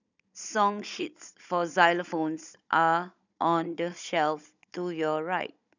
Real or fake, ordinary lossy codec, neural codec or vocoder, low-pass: fake; none; codec, 16 kHz, 16 kbps, FunCodec, trained on Chinese and English, 50 frames a second; 7.2 kHz